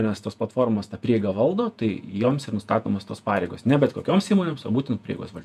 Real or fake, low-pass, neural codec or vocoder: fake; 14.4 kHz; vocoder, 48 kHz, 128 mel bands, Vocos